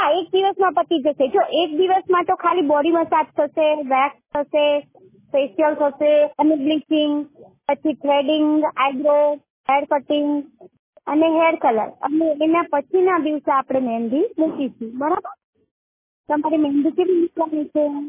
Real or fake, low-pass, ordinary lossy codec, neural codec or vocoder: real; 3.6 kHz; MP3, 16 kbps; none